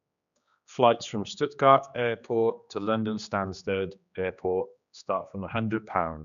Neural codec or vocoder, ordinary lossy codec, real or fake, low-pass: codec, 16 kHz, 2 kbps, X-Codec, HuBERT features, trained on general audio; none; fake; 7.2 kHz